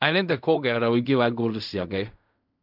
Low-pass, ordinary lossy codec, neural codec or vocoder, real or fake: 5.4 kHz; none; codec, 16 kHz in and 24 kHz out, 0.4 kbps, LongCat-Audio-Codec, fine tuned four codebook decoder; fake